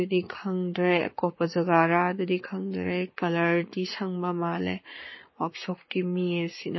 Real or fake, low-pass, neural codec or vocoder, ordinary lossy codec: fake; 7.2 kHz; codec, 44.1 kHz, 7.8 kbps, Pupu-Codec; MP3, 24 kbps